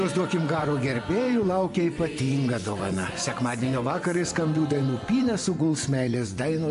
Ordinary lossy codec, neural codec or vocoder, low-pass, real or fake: MP3, 64 kbps; vocoder, 24 kHz, 100 mel bands, Vocos; 10.8 kHz; fake